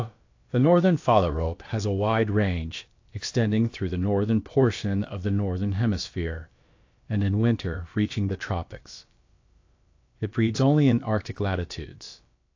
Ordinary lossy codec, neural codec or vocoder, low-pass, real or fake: AAC, 48 kbps; codec, 16 kHz, about 1 kbps, DyCAST, with the encoder's durations; 7.2 kHz; fake